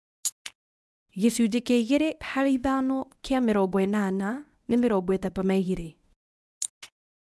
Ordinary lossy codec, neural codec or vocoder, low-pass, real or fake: none; codec, 24 kHz, 0.9 kbps, WavTokenizer, medium speech release version 1; none; fake